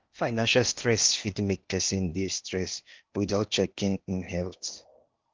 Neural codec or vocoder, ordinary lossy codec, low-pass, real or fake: codec, 16 kHz in and 24 kHz out, 0.8 kbps, FocalCodec, streaming, 65536 codes; Opus, 32 kbps; 7.2 kHz; fake